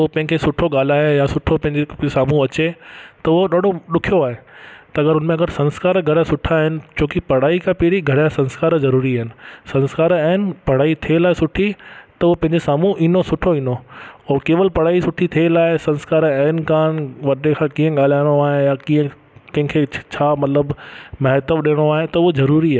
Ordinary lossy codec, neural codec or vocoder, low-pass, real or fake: none; none; none; real